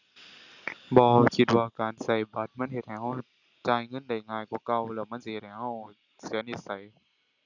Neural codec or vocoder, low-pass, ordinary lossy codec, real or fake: none; 7.2 kHz; none; real